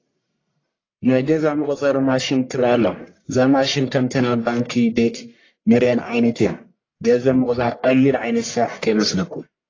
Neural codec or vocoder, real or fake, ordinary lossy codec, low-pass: codec, 44.1 kHz, 1.7 kbps, Pupu-Codec; fake; AAC, 32 kbps; 7.2 kHz